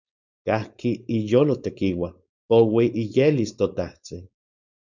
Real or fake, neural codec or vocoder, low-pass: fake; codec, 16 kHz, 4.8 kbps, FACodec; 7.2 kHz